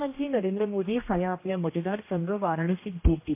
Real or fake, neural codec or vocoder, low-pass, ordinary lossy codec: fake; codec, 16 kHz, 1 kbps, X-Codec, HuBERT features, trained on general audio; 3.6 kHz; MP3, 24 kbps